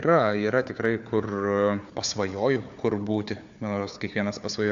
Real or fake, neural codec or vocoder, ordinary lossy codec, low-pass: fake; codec, 16 kHz, 4 kbps, FunCodec, trained on Chinese and English, 50 frames a second; AAC, 64 kbps; 7.2 kHz